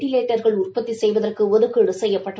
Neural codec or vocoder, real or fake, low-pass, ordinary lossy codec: none; real; none; none